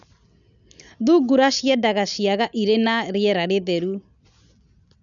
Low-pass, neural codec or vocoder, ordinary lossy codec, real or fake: 7.2 kHz; none; none; real